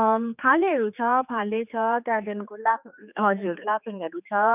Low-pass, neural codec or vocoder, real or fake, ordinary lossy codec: 3.6 kHz; codec, 16 kHz, 4 kbps, X-Codec, HuBERT features, trained on general audio; fake; none